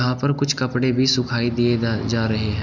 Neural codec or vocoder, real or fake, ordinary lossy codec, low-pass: none; real; none; 7.2 kHz